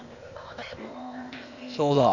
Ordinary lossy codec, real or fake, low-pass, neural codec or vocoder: none; fake; 7.2 kHz; codec, 16 kHz, 0.8 kbps, ZipCodec